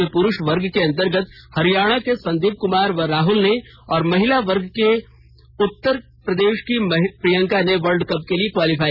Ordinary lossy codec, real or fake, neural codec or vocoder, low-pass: none; real; none; 5.4 kHz